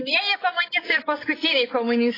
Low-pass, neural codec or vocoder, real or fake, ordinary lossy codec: 5.4 kHz; none; real; AAC, 24 kbps